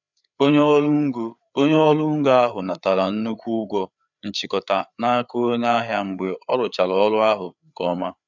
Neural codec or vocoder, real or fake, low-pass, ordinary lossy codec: codec, 16 kHz, 4 kbps, FreqCodec, larger model; fake; 7.2 kHz; none